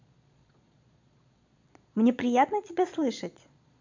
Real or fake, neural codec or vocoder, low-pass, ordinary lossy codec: fake; vocoder, 22.05 kHz, 80 mel bands, Vocos; 7.2 kHz; MP3, 64 kbps